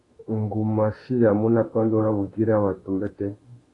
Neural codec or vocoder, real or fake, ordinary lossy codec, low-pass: autoencoder, 48 kHz, 32 numbers a frame, DAC-VAE, trained on Japanese speech; fake; AAC, 32 kbps; 10.8 kHz